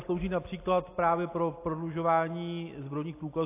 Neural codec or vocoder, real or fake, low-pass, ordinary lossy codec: none; real; 3.6 kHz; AAC, 24 kbps